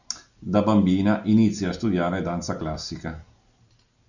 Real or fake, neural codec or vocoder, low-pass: real; none; 7.2 kHz